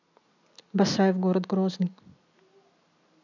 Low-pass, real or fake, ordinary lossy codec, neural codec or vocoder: 7.2 kHz; real; none; none